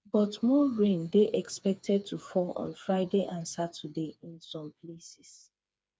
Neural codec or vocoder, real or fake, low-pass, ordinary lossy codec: codec, 16 kHz, 4 kbps, FreqCodec, smaller model; fake; none; none